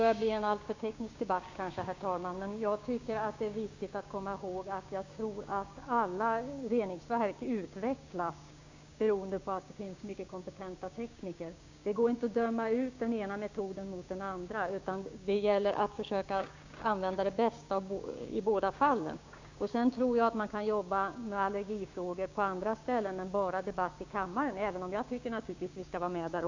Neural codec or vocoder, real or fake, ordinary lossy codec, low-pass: codec, 16 kHz, 6 kbps, DAC; fake; AAC, 48 kbps; 7.2 kHz